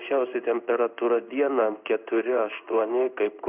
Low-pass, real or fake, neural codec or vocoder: 3.6 kHz; fake; codec, 16 kHz in and 24 kHz out, 1 kbps, XY-Tokenizer